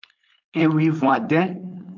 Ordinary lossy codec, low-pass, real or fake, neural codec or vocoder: MP3, 64 kbps; 7.2 kHz; fake; codec, 16 kHz, 4.8 kbps, FACodec